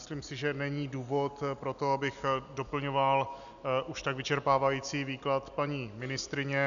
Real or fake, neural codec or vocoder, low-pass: real; none; 7.2 kHz